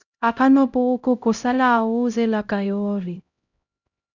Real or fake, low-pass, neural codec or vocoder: fake; 7.2 kHz; codec, 16 kHz, 0.5 kbps, X-Codec, HuBERT features, trained on LibriSpeech